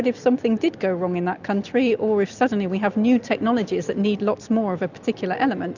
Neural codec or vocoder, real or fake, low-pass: none; real; 7.2 kHz